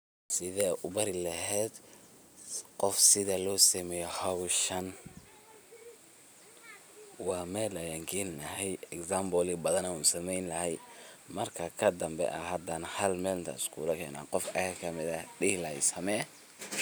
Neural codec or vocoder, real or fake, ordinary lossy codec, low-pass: none; real; none; none